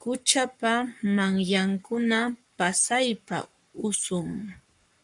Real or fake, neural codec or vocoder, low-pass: fake; codec, 44.1 kHz, 7.8 kbps, Pupu-Codec; 10.8 kHz